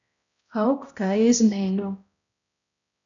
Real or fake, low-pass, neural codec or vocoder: fake; 7.2 kHz; codec, 16 kHz, 0.5 kbps, X-Codec, HuBERT features, trained on balanced general audio